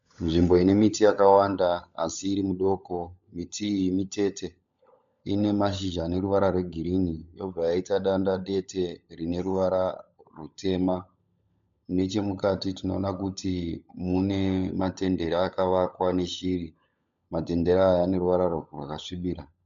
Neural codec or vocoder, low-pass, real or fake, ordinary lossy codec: codec, 16 kHz, 16 kbps, FunCodec, trained on LibriTTS, 50 frames a second; 7.2 kHz; fake; MP3, 64 kbps